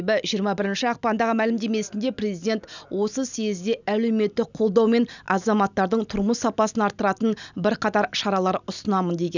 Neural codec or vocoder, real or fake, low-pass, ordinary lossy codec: none; real; 7.2 kHz; none